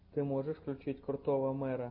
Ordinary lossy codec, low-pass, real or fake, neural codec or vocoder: MP3, 32 kbps; 5.4 kHz; real; none